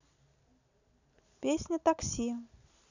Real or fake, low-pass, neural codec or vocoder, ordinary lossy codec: real; 7.2 kHz; none; none